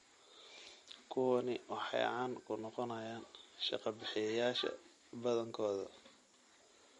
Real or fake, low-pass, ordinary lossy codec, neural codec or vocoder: real; 14.4 kHz; MP3, 48 kbps; none